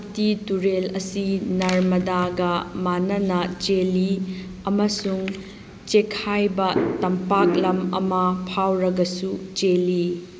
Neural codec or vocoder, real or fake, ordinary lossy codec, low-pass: none; real; none; none